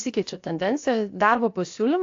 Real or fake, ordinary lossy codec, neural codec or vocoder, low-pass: fake; AAC, 48 kbps; codec, 16 kHz, 0.7 kbps, FocalCodec; 7.2 kHz